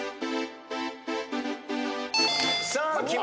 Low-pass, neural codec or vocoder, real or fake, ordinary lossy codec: none; none; real; none